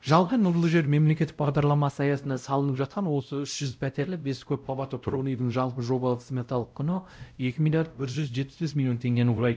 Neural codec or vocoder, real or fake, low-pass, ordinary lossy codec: codec, 16 kHz, 0.5 kbps, X-Codec, WavLM features, trained on Multilingual LibriSpeech; fake; none; none